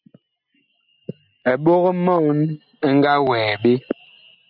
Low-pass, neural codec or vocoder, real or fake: 5.4 kHz; none; real